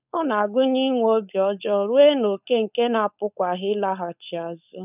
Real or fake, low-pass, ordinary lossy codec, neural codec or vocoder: fake; 3.6 kHz; none; codec, 16 kHz, 4.8 kbps, FACodec